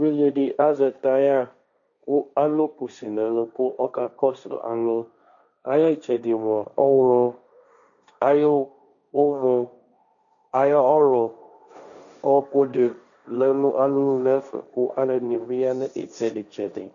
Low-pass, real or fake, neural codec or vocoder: 7.2 kHz; fake; codec, 16 kHz, 1.1 kbps, Voila-Tokenizer